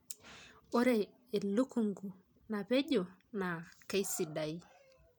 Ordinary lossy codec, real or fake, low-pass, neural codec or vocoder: none; fake; none; vocoder, 44.1 kHz, 128 mel bands every 512 samples, BigVGAN v2